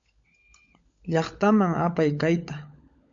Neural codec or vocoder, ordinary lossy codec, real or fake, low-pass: codec, 16 kHz, 16 kbps, FunCodec, trained on LibriTTS, 50 frames a second; MP3, 64 kbps; fake; 7.2 kHz